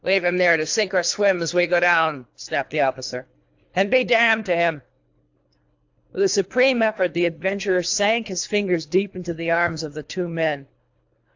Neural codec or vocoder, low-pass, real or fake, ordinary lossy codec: codec, 24 kHz, 3 kbps, HILCodec; 7.2 kHz; fake; AAC, 48 kbps